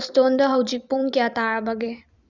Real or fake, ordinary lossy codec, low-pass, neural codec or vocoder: fake; Opus, 64 kbps; 7.2 kHz; vocoder, 44.1 kHz, 128 mel bands every 256 samples, BigVGAN v2